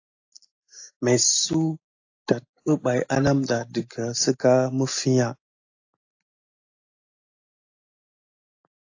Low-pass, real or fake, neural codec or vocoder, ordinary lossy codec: 7.2 kHz; real; none; AAC, 48 kbps